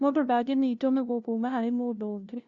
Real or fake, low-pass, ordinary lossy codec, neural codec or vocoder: fake; 7.2 kHz; Opus, 64 kbps; codec, 16 kHz, 0.5 kbps, FunCodec, trained on LibriTTS, 25 frames a second